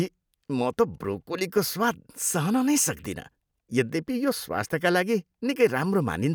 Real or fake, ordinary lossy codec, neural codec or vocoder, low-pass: real; none; none; none